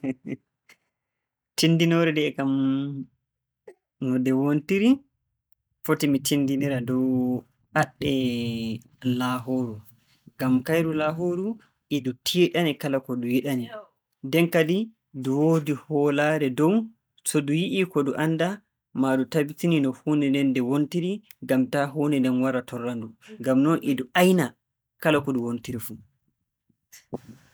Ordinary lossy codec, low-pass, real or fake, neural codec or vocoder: none; none; real; none